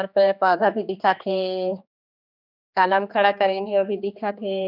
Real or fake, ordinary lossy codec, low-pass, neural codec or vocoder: fake; none; 5.4 kHz; codec, 16 kHz, 2 kbps, X-Codec, HuBERT features, trained on general audio